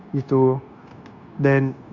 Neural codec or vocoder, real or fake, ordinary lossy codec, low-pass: codec, 16 kHz, 0.9 kbps, LongCat-Audio-Codec; fake; Opus, 64 kbps; 7.2 kHz